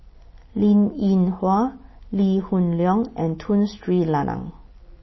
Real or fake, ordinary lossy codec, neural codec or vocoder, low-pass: real; MP3, 24 kbps; none; 7.2 kHz